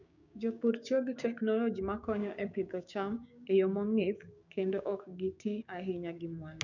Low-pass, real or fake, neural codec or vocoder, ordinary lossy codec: 7.2 kHz; fake; codec, 16 kHz, 6 kbps, DAC; none